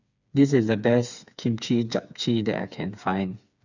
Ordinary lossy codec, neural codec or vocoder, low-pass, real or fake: none; codec, 16 kHz, 4 kbps, FreqCodec, smaller model; 7.2 kHz; fake